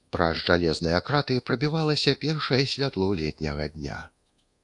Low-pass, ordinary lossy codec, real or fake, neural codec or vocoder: 10.8 kHz; AAC, 64 kbps; fake; codec, 24 kHz, 1.2 kbps, DualCodec